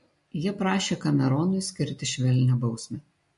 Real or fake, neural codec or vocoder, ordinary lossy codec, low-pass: real; none; MP3, 48 kbps; 10.8 kHz